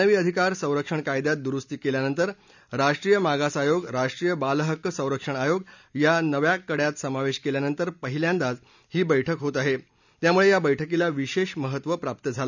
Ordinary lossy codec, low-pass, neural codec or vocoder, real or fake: none; 7.2 kHz; none; real